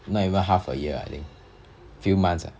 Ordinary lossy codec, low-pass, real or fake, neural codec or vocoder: none; none; real; none